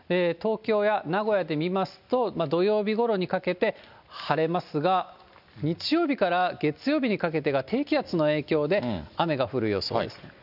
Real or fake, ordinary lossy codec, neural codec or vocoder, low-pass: real; none; none; 5.4 kHz